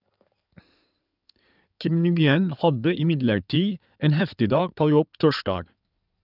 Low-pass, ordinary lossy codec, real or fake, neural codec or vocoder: 5.4 kHz; none; fake; codec, 16 kHz in and 24 kHz out, 2.2 kbps, FireRedTTS-2 codec